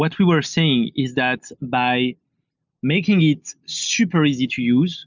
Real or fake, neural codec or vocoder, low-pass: real; none; 7.2 kHz